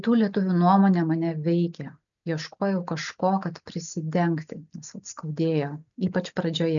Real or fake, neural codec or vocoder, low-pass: real; none; 7.2 kHz